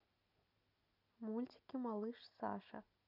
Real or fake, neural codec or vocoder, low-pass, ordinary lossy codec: real; none; 5.4 kHz; none